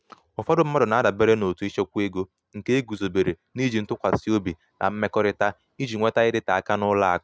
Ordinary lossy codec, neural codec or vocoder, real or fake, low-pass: none; none; real; none